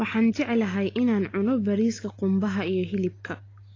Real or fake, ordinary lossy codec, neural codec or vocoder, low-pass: real; AAC, 32 kbps; none; 7.2 kHz